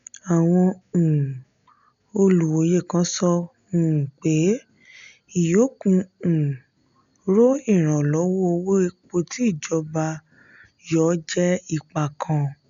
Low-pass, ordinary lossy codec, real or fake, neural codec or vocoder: 7.2 kHz; none; real; none